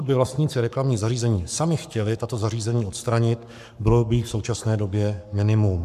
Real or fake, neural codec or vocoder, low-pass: fake; codec, 44.1 kHz, 7.8 kbps, Pupu-Codec; 14.4 kHz